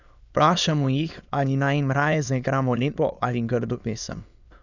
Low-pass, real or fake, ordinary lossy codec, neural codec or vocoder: 7.2 kHz; fake; none; autoencoder, 22.05 kHz, a latent of 192 numbers a frame, VITS, trained on many speakers